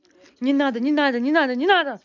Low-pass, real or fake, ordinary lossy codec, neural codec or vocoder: 7.2 kHz; real; none; none